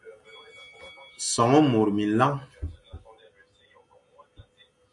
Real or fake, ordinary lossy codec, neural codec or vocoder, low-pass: real; AAC, 64 kbps; none; 10.8 kHz